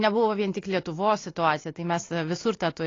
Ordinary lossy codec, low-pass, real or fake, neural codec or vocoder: AAC, 32 kbps; 7.2 kHz; real; none